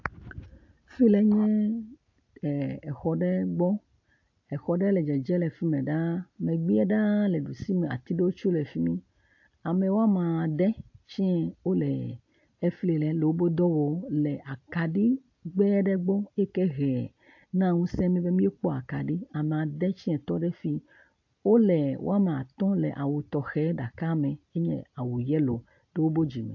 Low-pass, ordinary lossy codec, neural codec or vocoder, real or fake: 7.2 kHz; AAC, 48 kbps; none; real